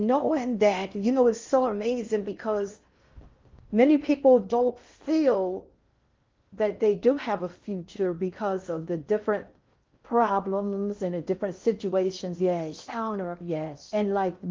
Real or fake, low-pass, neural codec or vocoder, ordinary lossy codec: fake; 7.2 kHz; codec, 16 kHz in and 24 kHz out, 0.6 kbps, FocalCodec, streaming, 4096 codes; Opus, 32 kbps